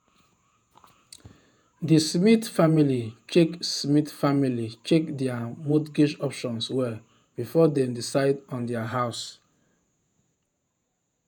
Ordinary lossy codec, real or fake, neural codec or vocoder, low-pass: none; fake; vocoder, 48 kHz, 128 mel bands, Vocos; none